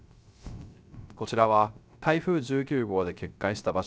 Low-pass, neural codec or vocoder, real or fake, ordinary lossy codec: none; codec, 16 kHz, 0.3 kbps, FocalCodec; fake; none